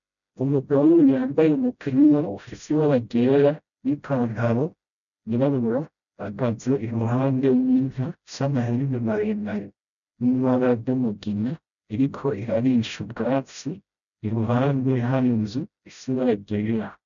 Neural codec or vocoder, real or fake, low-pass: codec, 16 kHz, 0.5 kbps, FreqCodec, smaller model; fake; 7.2 kHz